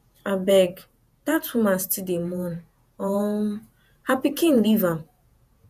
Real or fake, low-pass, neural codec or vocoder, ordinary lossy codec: fake; 14.4 kHz; vocoder, 48 kHz, 128 mel bands, Vocos; none